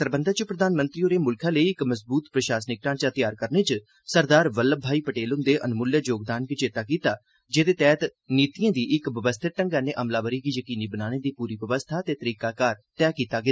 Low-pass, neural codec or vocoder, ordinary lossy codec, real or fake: none; none; none; real